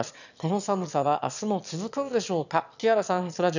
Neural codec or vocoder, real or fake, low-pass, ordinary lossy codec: autoencoder, 22.05 kHz, a latent of 192 numbers a frame, VITS, trained on one speaker; fake; 7.2 kHz; none